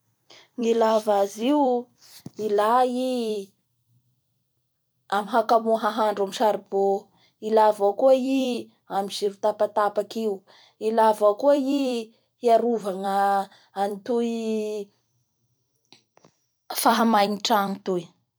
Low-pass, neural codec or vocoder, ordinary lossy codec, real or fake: none; vocoder, 44.1 kHz, 128 mel bands every 256 samples, BigVGAN v2; none; fake